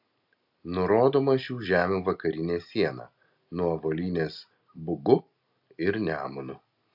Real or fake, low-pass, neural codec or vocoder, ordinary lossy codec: real; 5.4 kHz; none; AAC, 48 kbps